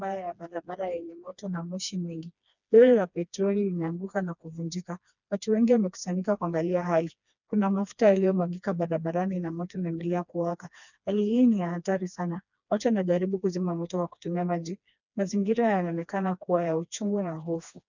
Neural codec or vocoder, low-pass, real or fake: codec, 16 kHz, 2 kbps, FreqCodec, smaller model; 7.2 kHz; fake